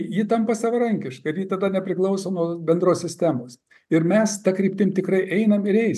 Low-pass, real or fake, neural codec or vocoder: 14.4 kHz; real; none